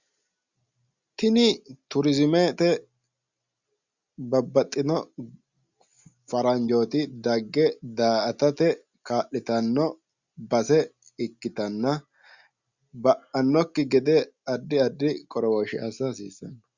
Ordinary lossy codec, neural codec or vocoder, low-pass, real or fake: Opus, 64 kbps; none; 7.2 kHz; real